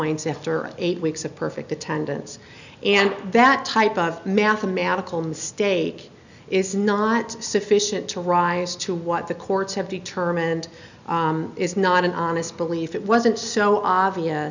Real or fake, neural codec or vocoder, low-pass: real; none; 7.2 kHz